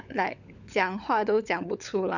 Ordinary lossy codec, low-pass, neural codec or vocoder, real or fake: none; 7.2 kHz; codec, 16 kHz, 16 kbps, FunCodec, trained on LibriTTS, 50 frames a second; fake